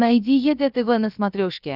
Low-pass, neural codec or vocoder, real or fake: 5.4 kHz; codec, 16 kHz, about 1 kbps, DyCAST, with the encoder's durations; fake